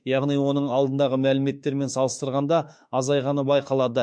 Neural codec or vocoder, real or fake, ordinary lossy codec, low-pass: autoencoder, 48 kHz, 32 numbers a frame, DAC-VAE, trained on Japanese speech; fake; MP3, 64 kbps; 9.9 kHz